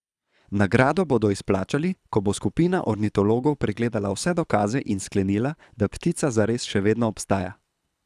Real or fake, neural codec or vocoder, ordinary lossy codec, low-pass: fake; codec, 24 kHz, 6 kbps, HILCodec; none; none